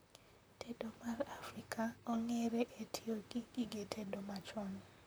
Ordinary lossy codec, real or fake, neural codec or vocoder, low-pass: none; fake; vocoder, 44.1 kHz, 128 mel bands, Pupu-Vocoder; none